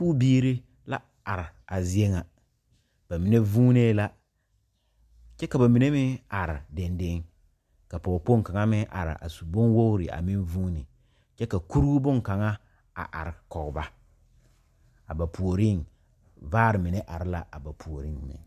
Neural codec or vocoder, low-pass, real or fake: none; 14.4 kHz; real